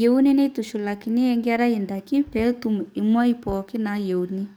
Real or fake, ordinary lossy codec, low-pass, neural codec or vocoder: fake; none; none; codec, 44.1 kHz, 7.8 kbps, DAC